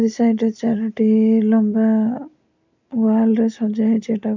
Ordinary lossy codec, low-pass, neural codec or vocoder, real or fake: MP3, 64 kbps; 7.2 kHz; none; real